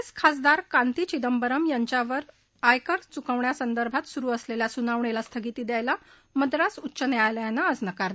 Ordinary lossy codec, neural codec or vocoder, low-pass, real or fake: none; none; none; real